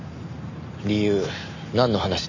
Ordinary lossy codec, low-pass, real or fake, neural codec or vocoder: none; 7.2 kHz; real; none